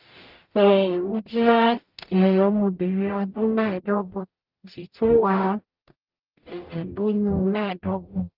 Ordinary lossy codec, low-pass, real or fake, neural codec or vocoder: Opus, 32 kbps; 5.4 kHz; fake; codec, 44.1 kHz, 0.9 kbps, DAC